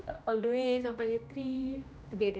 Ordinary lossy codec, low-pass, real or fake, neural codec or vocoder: none; none; fake; codec, 16 kHz, 1 kbps, X-Codec, HuBERT features, trained on general audio